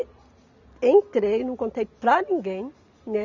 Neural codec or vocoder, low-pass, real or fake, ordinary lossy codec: none; 7.2 kHz; real; none